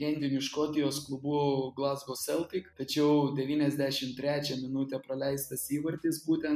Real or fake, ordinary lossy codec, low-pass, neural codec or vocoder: real; MP3, 96 kbps; 14.4 kHz; none